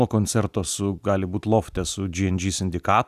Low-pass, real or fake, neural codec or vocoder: 14.4 kHz; real; none